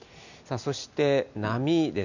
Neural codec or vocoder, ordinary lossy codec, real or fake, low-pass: none; none; real; 7.2 kHz